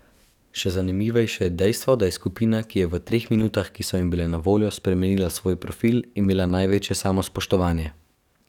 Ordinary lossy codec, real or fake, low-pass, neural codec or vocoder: none; fake; 19.8 kHz; codec, 44.1 kHz, 7.8 kbps, DAC